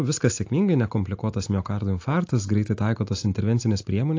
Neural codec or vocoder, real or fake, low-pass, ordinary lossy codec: none; real; 7.2 kHz; AAC, 48 kbps